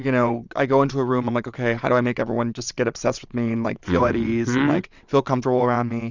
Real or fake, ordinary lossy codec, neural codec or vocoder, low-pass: fake; Opus, 64 kbps; vocoder, 22.05 kHz, 80 mel bands, WaveNeXt; 7.2 kHz